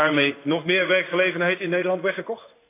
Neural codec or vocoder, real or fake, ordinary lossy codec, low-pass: codec, 16 kHz in and 24 kHz out, 1 kbps, XY-Tokenizer; fake; AAC, 24 kbps; 3.6 kHz